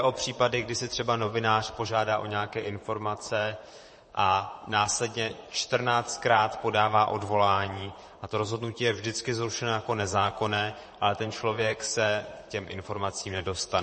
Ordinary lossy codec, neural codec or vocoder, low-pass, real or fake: MP3, 32 kbps; vocoder, 44.1 kHz, 128 mel bands, Pupu-Vocoder; 10.8 kHz; fake